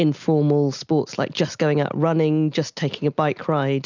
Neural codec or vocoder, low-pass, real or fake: none; 7.2 kHz; real